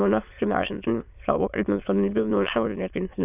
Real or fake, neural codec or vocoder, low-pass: fake; autoencoder, 22.05 kHz, a latent of 192 numbers a frame, VITS, trained on many speakers; 3.6 kHz